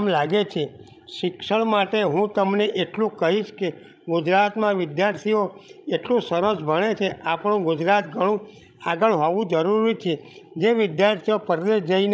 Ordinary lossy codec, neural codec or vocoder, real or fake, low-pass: none; codec, 16 kHz, 16 kbps, FreqCodec, larger model; fake; none